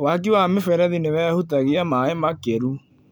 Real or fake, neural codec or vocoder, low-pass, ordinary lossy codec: real; none; none; none